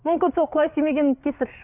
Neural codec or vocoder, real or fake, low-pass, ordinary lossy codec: codec, 16 kHz, 8 kbps, FreqCodec, larger model; fake; 3.6 kHz; none